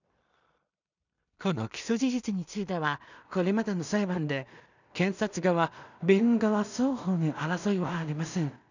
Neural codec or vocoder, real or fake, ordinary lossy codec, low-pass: codec, 16 kHz in and 24 kHz out, 0.4 kbps, LongCat-Audio-Codec, two codebook decoder; fake; none; 7.2 kHz